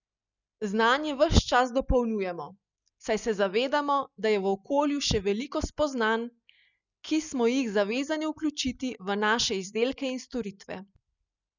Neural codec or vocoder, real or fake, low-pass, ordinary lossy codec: none; real; 7.2 kHz; none